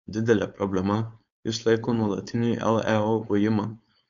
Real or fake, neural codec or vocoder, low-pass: fake; codec, 16 kHz, 4.8 kbps, FACodec; 7.2 kHz